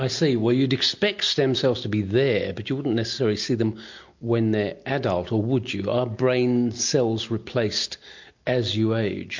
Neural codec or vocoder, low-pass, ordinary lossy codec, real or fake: none; 7.2 kHz; MP3, 48 kbps; real